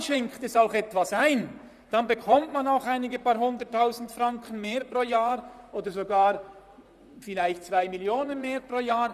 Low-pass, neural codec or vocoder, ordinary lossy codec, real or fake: 14.4 kHz; vocoder, 44.1 kHz, 128 mel bands, Pupu-Vocoder; none; fake